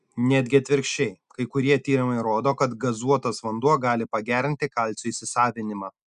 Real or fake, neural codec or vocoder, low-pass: real; none; 10.8 kHz